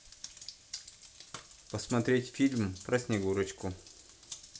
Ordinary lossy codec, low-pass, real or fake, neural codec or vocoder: none; none; real; none